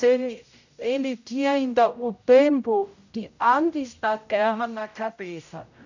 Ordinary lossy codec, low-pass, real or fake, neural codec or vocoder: none; 7.2 kHz; fake; codec, 16 kHz, 0.5 kbps, X-Codec, HuBERT features, trained on general audio